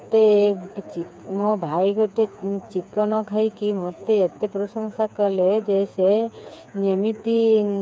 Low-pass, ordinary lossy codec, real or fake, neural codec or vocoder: none; none; fake; codec, 16 kHz, 4 kbps, FreqCodec, smaller model